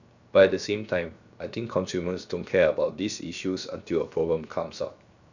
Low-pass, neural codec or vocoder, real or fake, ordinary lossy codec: 7.2 kHz; codec, 16 kHz, 0.7 kbps, FocalCodec; fake; none